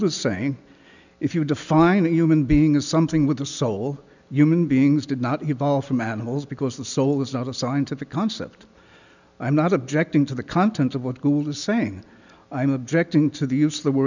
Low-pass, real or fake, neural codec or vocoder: 7.2 kHz; real; none